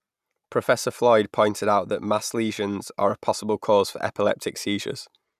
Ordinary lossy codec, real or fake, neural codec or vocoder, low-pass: none; real; none; 14.4 kHz